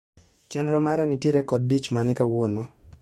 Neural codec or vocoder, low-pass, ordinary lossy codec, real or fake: codec, 44.1 kHz, 2.6 kbps, DAC; 19.8 kHz; MP3, 64 kbps; fake